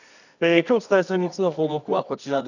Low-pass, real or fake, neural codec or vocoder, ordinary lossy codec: 7.2 kHz; fake; codec, 24 kHz, 0.9 kbps, WavTokenizer, medium music audio release; none